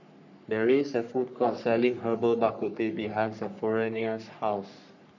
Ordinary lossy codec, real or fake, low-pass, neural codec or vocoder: none; fake; 7.2 kHz; codec, 44.1 kHz, 3.4 kbps, Pupu-Codec